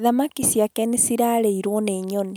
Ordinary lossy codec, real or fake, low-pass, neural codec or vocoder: none; real; none; none